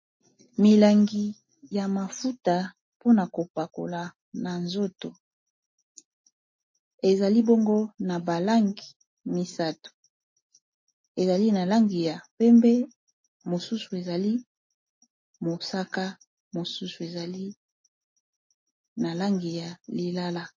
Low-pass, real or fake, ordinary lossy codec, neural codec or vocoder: 7.2 kHz; real; MP3, 32 kbps; none